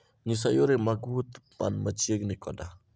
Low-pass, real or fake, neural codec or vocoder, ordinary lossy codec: none; real; none; none